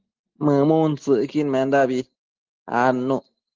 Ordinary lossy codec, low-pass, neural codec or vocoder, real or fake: Opus, 16 kbps; 7.2 kHz; none; real